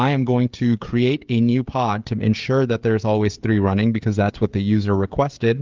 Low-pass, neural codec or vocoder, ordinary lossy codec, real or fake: 7.2 kHz; codec, 16 kHz, 16 kbps, FreqCodec, smaller model; Opus, 32 kbps; fake